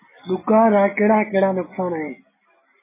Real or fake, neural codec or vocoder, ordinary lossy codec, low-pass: real; none; MP3, 16 kbps; 3.6 kHz